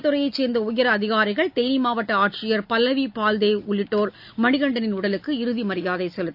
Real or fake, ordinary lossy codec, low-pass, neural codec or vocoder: real; AAC, 48 kbps; 5.4 kHz; none